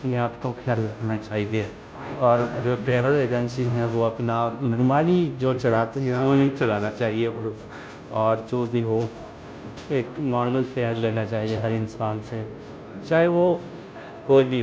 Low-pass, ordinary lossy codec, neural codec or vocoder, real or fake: none; none; codec, 16 kHz, 0.5 kbps, FunCodec, trained on Chinese and English, 25 frames a second; fake